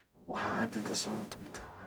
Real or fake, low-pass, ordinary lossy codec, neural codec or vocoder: fake; none; none; codec, 44.1 kHz, 0.9 kbps, DAC